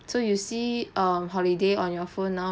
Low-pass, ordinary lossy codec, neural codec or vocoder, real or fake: none; none; none; real